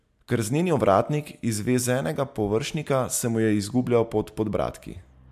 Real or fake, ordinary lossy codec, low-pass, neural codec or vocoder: real; MP3, 96 kbps; 14.4 kHz; none